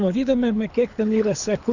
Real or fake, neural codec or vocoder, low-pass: fake; codec, 24 kHz, 6 kbps, HILCodec; 7.2 kHz